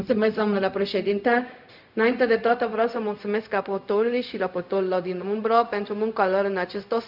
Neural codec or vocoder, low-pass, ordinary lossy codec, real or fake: codec, 16 kHz, 0.4 kbps, LongCat-Audio-Codec; 5.4 kHz; none; fake